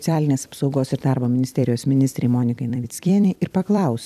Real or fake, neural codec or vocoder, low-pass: fake; vocoder, 44.1 kHz, 128 mel bands every 256 samples, BigVGAN v2; 14.4 kHz